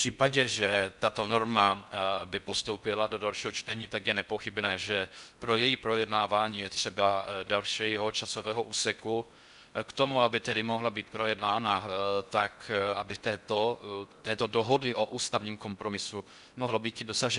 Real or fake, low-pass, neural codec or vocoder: fake; 10.8 kHz; codec, 16 kHz in and 24 kHz out, 0.6 kbps, FocalCodec, streaming, 4096 codes